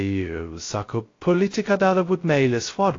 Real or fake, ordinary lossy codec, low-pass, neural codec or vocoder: fake; AAC, 32 kbps; 7.2 kHz; codec, 16 kHz, 0.2 kbps, FocalCodec